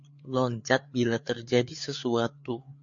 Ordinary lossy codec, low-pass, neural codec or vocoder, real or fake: MP3, 48 kbps; 7.2 kHz; codec, 16 kHz, 4 kbps, FreqCodec, larger model; fake